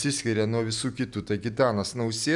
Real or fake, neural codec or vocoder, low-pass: real; none; 10.8 kHz